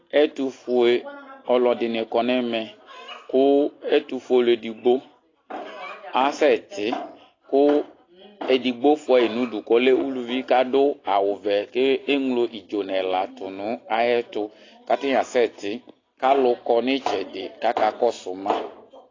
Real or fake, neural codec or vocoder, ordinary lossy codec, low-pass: real; none; AAC, 32 kbps; 7.2 kHz